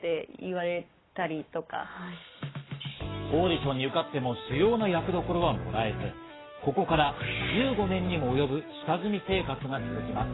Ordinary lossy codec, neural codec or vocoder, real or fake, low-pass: AAC, 16 kbps; codec, 44.1 kHz, 7.8 kbps, Pupu-Codec; fake; 7.2 kHz